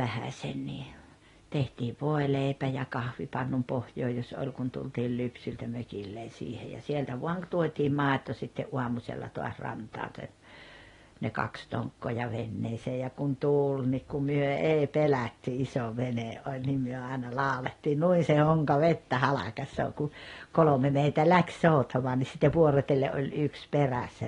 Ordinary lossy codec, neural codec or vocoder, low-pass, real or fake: AAC, 32 kbps; none; 10.8 kHz; real